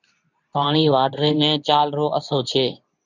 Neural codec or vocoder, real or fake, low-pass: codec, 24 kHz, 0.9 kbps, WavTokenizer, medium speech release version 2; fake; 7.2 kHz